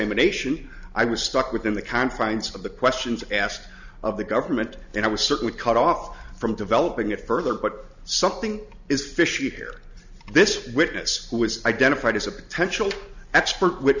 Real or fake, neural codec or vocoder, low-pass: real; none; 7.2 kHz